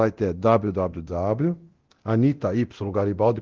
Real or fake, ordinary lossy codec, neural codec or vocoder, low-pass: fake; Opus, 16 kbps; codec, 24 kHz, 0.9 kbps, DualCodec; 7.2 kHz